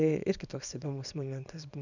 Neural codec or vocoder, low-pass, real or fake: codec, 24 kHz, 0.9 kbps, WavTokenizer, small release; 7.2 kHz; fake